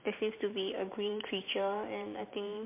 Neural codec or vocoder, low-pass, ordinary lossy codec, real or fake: vocoder, 44.1 kHz, 128 mel bands every 512 samples, BigVGAN v2; 3.6 kHz; MP3, 24 kbps; fake